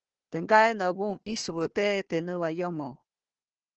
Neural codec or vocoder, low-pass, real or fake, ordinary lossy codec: codec, 16 kHz, 1 kbps, FunCodec, trained on Chinese and English, 50 frames a second; 7.2 kHz; fake; Opus, 16 kbps